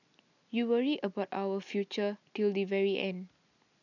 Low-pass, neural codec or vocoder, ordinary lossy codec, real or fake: 7.2 kHz; none; none; real